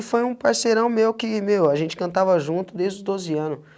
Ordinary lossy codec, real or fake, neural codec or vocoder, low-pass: none; real; none; none